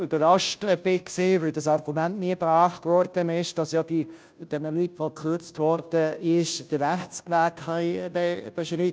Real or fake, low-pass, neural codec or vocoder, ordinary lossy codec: fake; none; codec, 16 kHz, 0.5 kbps, FunCodec, trained on Chinese and English, 25 frames a second; none